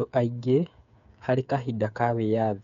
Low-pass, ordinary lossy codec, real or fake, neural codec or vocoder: 7.2 kHz; none; fake; codec, 16 kHz, 8 kbps, FreqCodec, smaller model